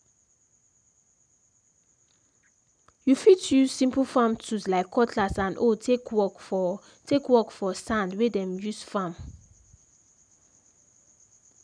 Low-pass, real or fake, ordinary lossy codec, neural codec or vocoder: 9.9 kHz; real; none; none